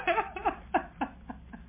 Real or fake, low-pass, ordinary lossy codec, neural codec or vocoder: real; 3.6 kHz; MP3, 24 kbps; none